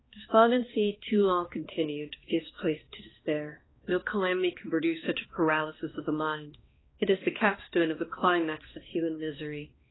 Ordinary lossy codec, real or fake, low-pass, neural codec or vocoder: AAC, 16 kbps; fake; 7.2 kHz; codec, 16 kHz, 2 kbps, X-Codec, HuBERT features, trained on balanced general audio